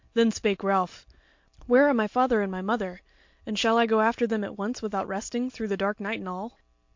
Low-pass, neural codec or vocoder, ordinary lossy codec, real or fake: 7.2 kHz; none; MP3, 48 kbps; real